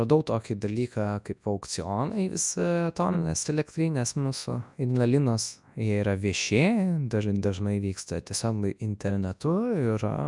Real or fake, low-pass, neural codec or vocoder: fake; 10.8 kHz; codec, 24 kHz, 0.9 kbps, WavTokenizer, large speech release